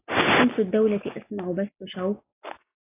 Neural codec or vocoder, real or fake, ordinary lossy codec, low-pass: none; real; AAC, 24 kbps; 3.6 kHz